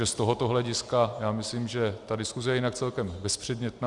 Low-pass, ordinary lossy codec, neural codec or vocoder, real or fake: 10.8 kHz; Opus, 64 kbps; none; real